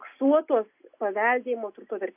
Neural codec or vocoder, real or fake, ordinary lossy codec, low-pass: none; real; AAC, 32 kbps; 3.6 kHz